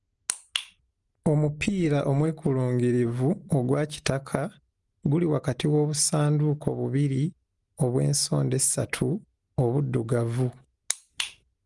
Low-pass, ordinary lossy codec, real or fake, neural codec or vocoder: 10.8 kHz; Opus, 24 kbps; real; none